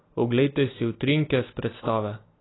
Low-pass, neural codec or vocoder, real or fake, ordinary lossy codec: 7.2 kHz; none; real; AAC, 16 kbps